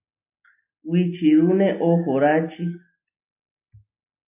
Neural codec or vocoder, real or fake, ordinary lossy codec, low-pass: none; real; AAC, 32 kbps; 3.6 kHz